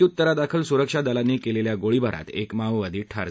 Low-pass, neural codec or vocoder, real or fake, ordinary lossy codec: none; none; real; none